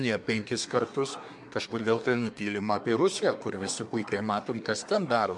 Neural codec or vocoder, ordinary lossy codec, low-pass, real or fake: codec, 24 kHz, 1 kbps, SNAC; MP3, 64 kbps; 10.8 kHz; fake